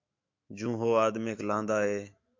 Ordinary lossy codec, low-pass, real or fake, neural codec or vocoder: MP3, 48 kbps; 7.2 kHz; fake; autoencoder, 48 kHz, 128 numbers a frame, DAC-VAE, trained on Japanese speech